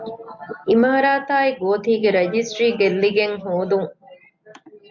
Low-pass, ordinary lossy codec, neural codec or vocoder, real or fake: 7.2 kHz; MP3, 48 kbps; none; real